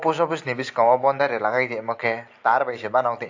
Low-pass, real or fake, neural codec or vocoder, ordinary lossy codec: 7.2 kHz; real; none; AAC, 48 kbps